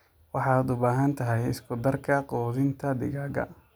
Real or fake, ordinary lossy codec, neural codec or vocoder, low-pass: real; none; none; none